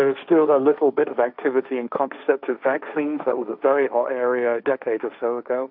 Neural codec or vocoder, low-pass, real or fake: codec, 16 kHz, 1.1 kbps, Voila-Tokenizer; 5.4 kHz; fake